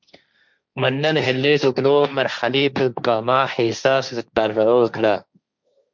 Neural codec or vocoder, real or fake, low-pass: codec, 16 kHz, 1.1 kbps, Voila-Tokenizer; fake; 7.2 kHz